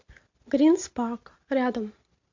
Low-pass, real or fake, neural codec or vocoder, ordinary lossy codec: 7.2 kHz; real; none; MP3, 48 kbps